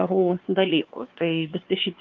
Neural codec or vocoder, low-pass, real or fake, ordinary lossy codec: codec, 16 kHz, 2 kbps, X-Codec, WavLM features, trained on Multilingual LibriSpeech; 7.2 kHz; fake; Opus, 32 kbps